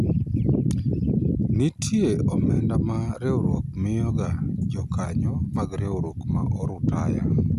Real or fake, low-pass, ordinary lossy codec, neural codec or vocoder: real; 14.4 kHz; none; none